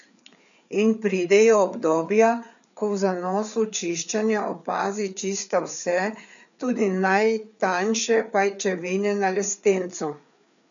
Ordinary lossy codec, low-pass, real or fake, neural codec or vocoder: none; 7.2 kHz; fake; codec, 16 kHz, 4 kbps, FreqCodec, larger model